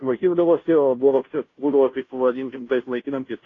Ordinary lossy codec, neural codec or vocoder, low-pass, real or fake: AAC, 32 kbps; codec, 16 kHz, 0.5 kbps, FunCodec, trained on Chinese and English, 25 frames a second; 7.2 kHz; fake